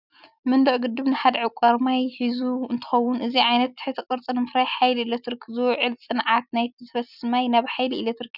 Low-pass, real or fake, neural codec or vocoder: 5.4 kHz; real; none